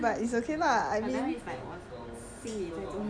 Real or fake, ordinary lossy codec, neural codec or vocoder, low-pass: real; none; none; 9.9 kHz